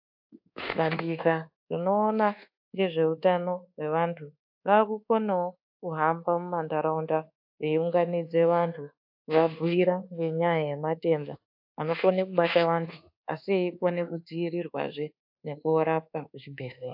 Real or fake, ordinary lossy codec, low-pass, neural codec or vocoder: fake; AAC, 48 kbps; 5.4 kHz; codec, 24 kHz, 1.2 kbps, DualCodec